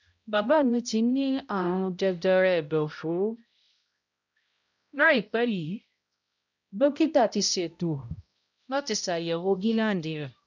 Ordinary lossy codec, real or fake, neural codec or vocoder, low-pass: none; fake; codec, 16 kHz, 0.5 kbps, X-Codec, HuBERT features, trained on balanced general audio; 7.2 kHz